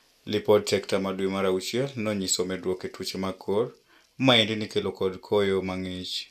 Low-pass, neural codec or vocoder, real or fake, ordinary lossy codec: 14.4 kHz; none; real; none